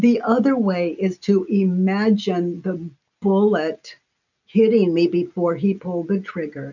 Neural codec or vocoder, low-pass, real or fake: none; 7.2 kHz; real